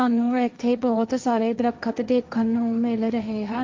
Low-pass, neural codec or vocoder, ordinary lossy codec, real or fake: 7.2 kHz; codec, 16 kHz, 1.1 kbps, Voila-Tokenizer; Opus, 24 kbps; fake